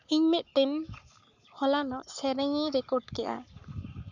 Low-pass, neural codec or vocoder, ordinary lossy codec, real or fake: 7.2 kHz; codec, 44.1 kHz, 7.8 kbps, Pupu-Codec; none; fake